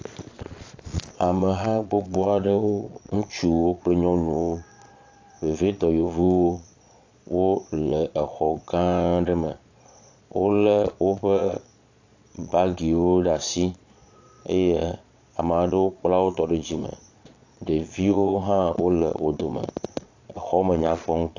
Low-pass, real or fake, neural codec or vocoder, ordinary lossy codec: 7.2 kHz; fake; vocoder, 22.05 kHz, 80 mel bands, Vocos; AAC, 48 kbps